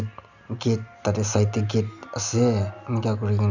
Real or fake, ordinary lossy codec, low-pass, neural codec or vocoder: real; none; 7.2 kHz; none